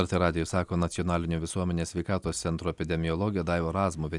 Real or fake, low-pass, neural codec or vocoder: real; 10.8 kHz; none